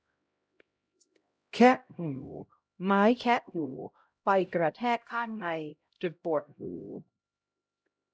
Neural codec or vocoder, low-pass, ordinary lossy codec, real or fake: codec, 16 kHz, 0.5 kbps, X-Codec, HuBERT features, trained on LibriSpeech; none; none; fake